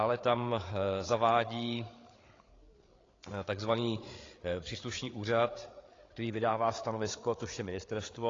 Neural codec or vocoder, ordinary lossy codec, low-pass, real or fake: codec, 16 kHz, 8 kbps, FreqCodec, larger model; AAC, 32 kbps; 7.2 kHz; fake